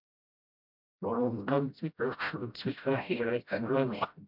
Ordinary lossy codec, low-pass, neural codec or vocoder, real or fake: MP3, 48 kbps; 5.4 kHz; codec, 16 kHz, 0.5 kbps, FreqCodec, smaller model; fake